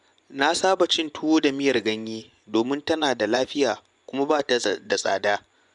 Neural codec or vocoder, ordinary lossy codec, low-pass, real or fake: vocoder, 24 kHz, 100 mel bands, Vocos; none; 10.8 kHz; fake